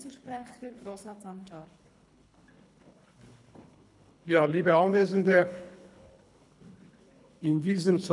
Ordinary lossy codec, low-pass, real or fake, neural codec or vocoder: none; 10.8 kHz; fake; codec, 24 kHz, 3 kbps, HILCodec